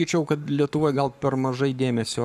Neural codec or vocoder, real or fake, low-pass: codec, 44.1 kHz, 7.8 kbps, Pupu-Codec; fake; 14.4 kHz